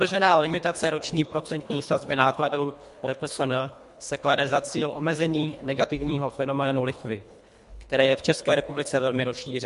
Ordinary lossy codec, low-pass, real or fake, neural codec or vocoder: MP3, 64 kbps; 10.8 kHz; fake; codec, 24 kHz, 1.5 kbps, HILCodec